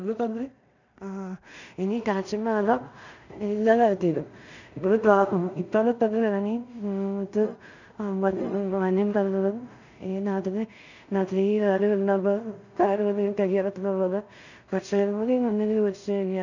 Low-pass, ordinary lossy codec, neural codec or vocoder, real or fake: 7.2 kHz; none; codec, 16 kHz in and 24 kHz out, 0.4 kbps, LongCat-Audio-Codec, two codebook decoder; fake